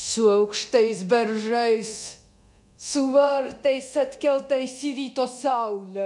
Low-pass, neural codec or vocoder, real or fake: 10.8 kHz; codec, 24 kHz, 0.9 kbps, DualCodec; fake